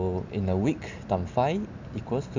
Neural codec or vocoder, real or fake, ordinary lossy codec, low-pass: none; real; MP3, 64 kbps; 7.2 kHz